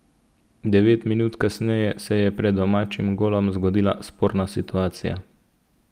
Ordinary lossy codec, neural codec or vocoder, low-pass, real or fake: Opus, 32 kbps; none; 14.4 kHz; real